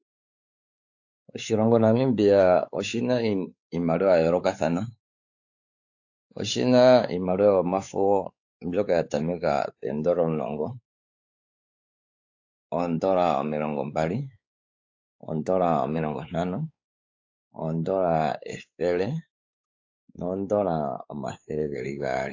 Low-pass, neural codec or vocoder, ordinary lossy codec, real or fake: 7.2 kHz; codec, 16 kHz, 4 kbps, X-Codec, WavLM features, trained on Multilingual LibriSpeech; AAC, 48 kbps; fake